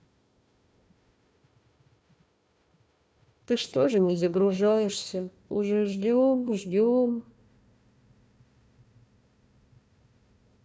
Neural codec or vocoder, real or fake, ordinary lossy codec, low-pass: codec, 16 kHz, 1 kbps, FunCodec, trained on Chinese and English, 50 frames a second; fake; none; none